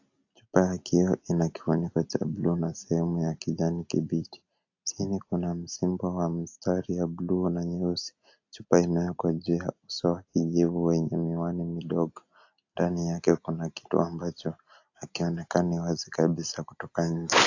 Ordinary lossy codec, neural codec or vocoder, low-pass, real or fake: AAC, 48 kbps; none; 7.2 kHz; real